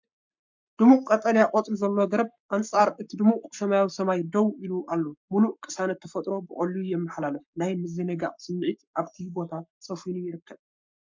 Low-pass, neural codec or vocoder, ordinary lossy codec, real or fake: 7.2 kHz; codec, 44.1 kHz, 7.8 kbps, Pupu-Codec; MP3, 64 kbps; fake